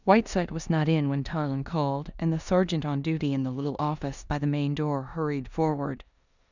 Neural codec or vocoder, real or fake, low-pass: codec, 16 kHz in and 24 kHz out, 0.9 kbps, LongCat-Audio-Codec, four codebook decoder; fake; 7.2 kHz